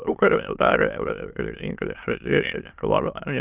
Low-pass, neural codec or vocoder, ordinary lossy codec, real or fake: 3.6 kHz; autoencoder, 22.05 kHz, a latent of 192 numbers a frame, VITS, trained on many speakers; Opus, 32 kbps; fake